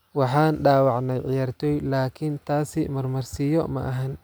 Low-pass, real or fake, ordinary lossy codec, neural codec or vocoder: none; real; none; none